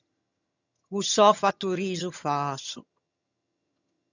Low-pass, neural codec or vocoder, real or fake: 7.2 kHz; vocoder, 22.05 kHz, 80 mel bands, HiFi-GAN; fake